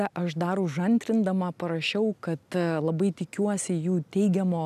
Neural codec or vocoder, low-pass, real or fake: none; 14.4 kHz; real